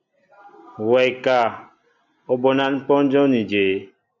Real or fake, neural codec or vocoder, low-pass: real; none; 7.2 kHz